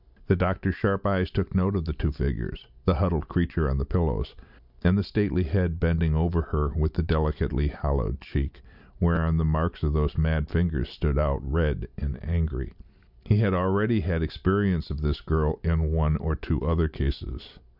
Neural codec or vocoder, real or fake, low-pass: none; real; 5.4 kHz